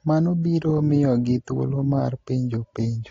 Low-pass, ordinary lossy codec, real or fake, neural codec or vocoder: 7.2 kHz; AAC, 32 kbps; real; none